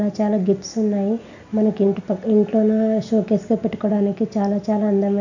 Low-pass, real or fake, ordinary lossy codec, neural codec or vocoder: 7.2 kHz; real; none; none